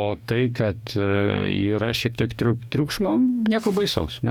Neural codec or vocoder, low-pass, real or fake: codec, 44.1 kHz, 2.6 kbps, DAC; 19.8 kHz; fake